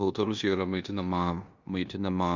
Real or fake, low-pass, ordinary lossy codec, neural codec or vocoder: fake; 7.2 kHz; Opus, 64 kbps; codec, 16 kHz in and 24 kHz out, 0.9 kbps, LongCat-Audio-Codec, four codebook decoder